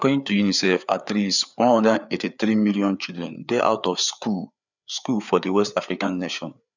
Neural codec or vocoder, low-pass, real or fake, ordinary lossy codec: codec, 16 kHz, 4 kbps, FreqCodec, larger model; 7.2 kHz; fake; none